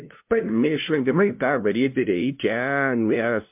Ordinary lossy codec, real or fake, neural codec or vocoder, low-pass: MP3, 32 kbps; fake; codec, 16 kHz, 0.5 kbps, FunCodec, trained on LibriTTS, 25 frames a second; 3.6 kHz